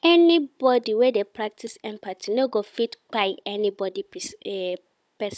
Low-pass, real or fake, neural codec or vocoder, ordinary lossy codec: none; fake; codec, 16 kHz, 16 kbps, FunCodec, trained on Chinese and English, 50 frames a second; none